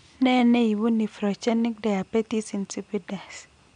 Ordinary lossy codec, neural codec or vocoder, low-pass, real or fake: none; none; 9.9 kHz; real